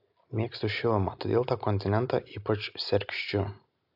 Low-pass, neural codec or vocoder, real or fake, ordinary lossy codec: 5.4 kHz; none; real; AAC, 48 kbps